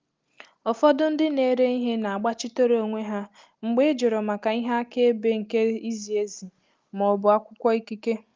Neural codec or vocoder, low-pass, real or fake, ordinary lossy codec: none; 7.2 kHz; real; Opus, 32 kbps